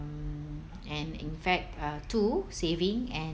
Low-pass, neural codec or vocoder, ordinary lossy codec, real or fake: none; none; none; real